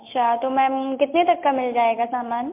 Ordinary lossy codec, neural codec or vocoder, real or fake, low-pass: MP3, 32 kbps; none; real; 3.6 kHz